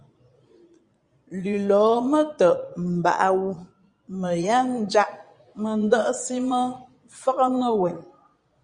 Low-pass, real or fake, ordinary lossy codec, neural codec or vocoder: 9.9 kHz; fake; Opus, 64 kbps; vocoder, 22.05 kHz, 80 mel bands, Vocos